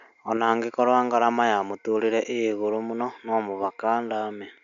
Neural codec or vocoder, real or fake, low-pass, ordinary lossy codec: none; real; 7.2 kHz; none